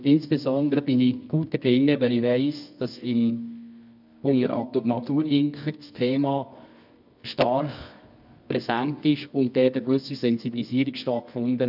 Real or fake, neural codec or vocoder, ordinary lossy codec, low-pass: fake; codec, 24 kHz, 0.9 kbps, WavTokenizer, medium music audio release; none; 5.4 kHz